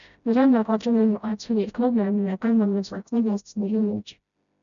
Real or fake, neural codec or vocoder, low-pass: fake; codec, 16 kHz, 0.5 kbps, FreqCodec, smaller model; 7.2 kHz